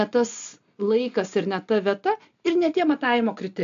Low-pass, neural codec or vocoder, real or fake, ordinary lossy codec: 7.2 kHz; none; real; AAC, 64 kbps